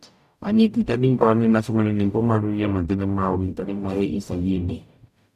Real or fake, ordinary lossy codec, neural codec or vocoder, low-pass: fake; none; codec, 44.1 kHz, 0.9 kbps, DAC; 14.4 kHz